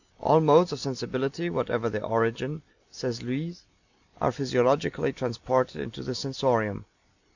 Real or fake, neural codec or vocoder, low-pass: real; none; 7.2 kHz